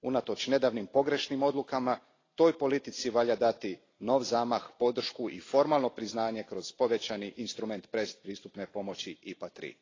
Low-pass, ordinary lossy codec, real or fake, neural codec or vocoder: 7.2 kHz; AAC, 32 kbps; real; none